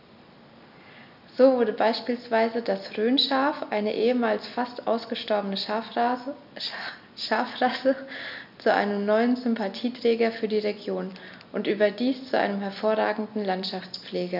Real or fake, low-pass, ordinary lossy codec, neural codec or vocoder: real; 5.4 kHz; none; none